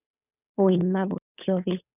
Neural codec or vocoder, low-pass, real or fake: codec, 16 kHz, 8 kbps, FunCodec, trained on Chinese and English, 25 frames a second; 3.6 kHz; fake